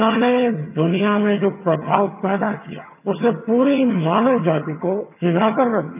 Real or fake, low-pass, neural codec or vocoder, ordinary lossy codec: fake; 3.6 kHz; vocoder, 22.05 kHz, 80 mel bands, HiFi-GAN; none